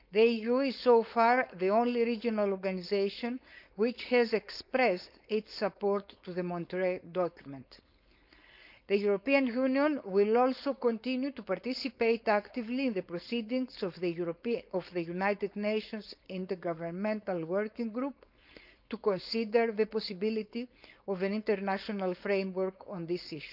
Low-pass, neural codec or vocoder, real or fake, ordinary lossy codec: 5.4 kHz; codec, 16 kHz, 4.8 kbps, FACodec; fake; none